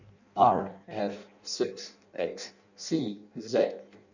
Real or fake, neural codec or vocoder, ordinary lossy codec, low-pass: fake; codec, 16 kHz in and 24 kHz out, 0.6 kbps, FireRedTTS-2 codec; none; 7.2 kHz